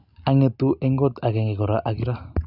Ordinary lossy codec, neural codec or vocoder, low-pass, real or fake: none; none; 5.4 kHz; real